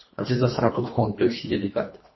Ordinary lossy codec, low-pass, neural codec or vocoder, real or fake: MP3, 24 kbps; 7.2 kHz; codec, 24 kHz, 1.5 kbps, HILCodec; fake